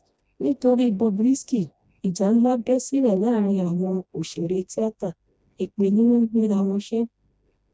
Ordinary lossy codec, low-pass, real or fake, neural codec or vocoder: none; none; fake; codec, 16 kHz, 1 kbps, FreqCodec, smaller model